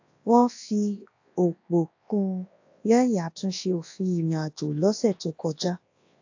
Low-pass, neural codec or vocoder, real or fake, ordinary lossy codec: 7.2 kHz; codec, 24 kHz, 0.9 kbps, WavTokenizer, large speech release; fake; AAC, 48 kbps